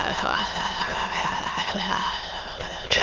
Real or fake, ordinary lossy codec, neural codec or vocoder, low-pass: fake; Opus, 32 kbps; autoencoder, 22.05 kHz, a latent of 192 numbers a frame, VITS, trained on many speakers; 7.2 kHz